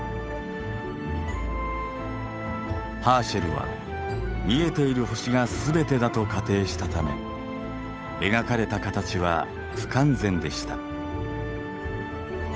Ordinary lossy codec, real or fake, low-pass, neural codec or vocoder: none; fake; none; codec, 16 kHz, 8 kbps, FunCodec, trained on Chinese and English, 25 frames a second